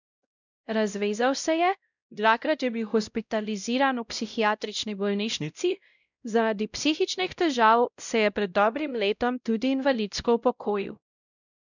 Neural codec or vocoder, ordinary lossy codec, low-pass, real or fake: codec, 16 kHz, 0.5 kbps, X-Codec, WavLM features, trained on Multilingual LibriSpeech; none; 7.2 kHz; fake